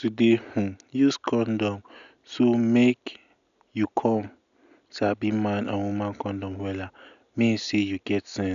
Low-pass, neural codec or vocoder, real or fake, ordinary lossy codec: 7.2 kHz; none; real; none